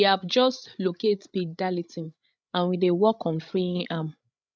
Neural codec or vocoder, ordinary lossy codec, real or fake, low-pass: codec, 16 kHz, 8 kbps, FreqCodec, larger model; none; fake; none